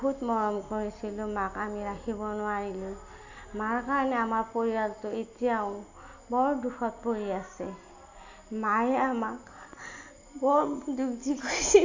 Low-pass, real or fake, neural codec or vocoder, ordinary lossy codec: 7.2 kHz; real; none; AAC, 48 kbps